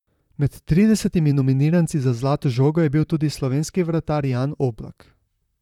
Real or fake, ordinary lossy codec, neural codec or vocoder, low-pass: fake; none; vocoder, 44.1 kHz, 128 mel bands, Pupu-Vocoder; 19.8 kHz